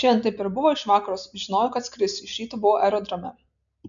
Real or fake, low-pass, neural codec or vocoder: real; 7.2 kHz; none